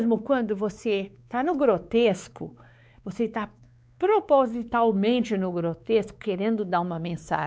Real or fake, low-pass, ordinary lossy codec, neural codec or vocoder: fake; none; none; codec, 16 kHz, 4 kbps, X-Codec, WavLM features, trained on Multilingual LibriSpeech